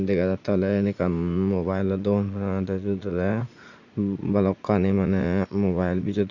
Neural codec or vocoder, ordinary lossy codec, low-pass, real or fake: vocoder, 44.1 kHz, 80 mel bands, Vocos; none; 7.2 kHz; fake